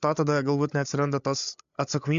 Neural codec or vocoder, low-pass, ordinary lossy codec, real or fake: codec, 16 kHz, 8 kbps, FreqCodec, larger model; 7.2 kHz; MP3, 64 kbps; fake